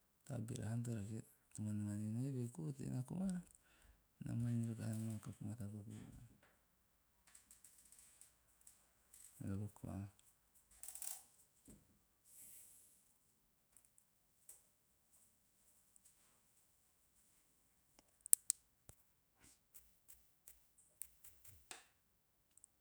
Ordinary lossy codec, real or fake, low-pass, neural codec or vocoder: none; fake; none; autoencoder, 48 kHz, 128 numbers a frame, DAC-VAE, trained on Japanese speech